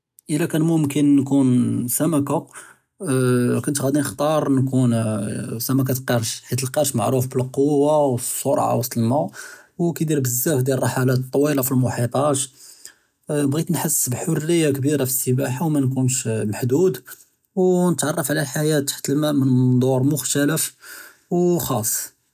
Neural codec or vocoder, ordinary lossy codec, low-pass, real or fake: none; none; 14.4 kHz; real